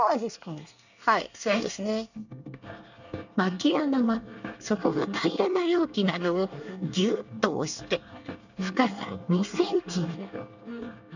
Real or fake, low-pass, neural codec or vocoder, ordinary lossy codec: fake; 7.2 kHz; codec, 24 kHz, 1 kbps, SNAC; none